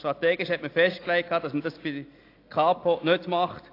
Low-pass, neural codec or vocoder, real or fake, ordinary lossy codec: 5.4 kHz; none; real; AAC, 32 kbps